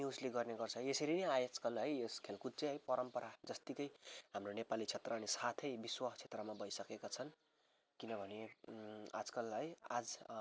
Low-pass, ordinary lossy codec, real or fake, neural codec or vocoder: none; none; real; none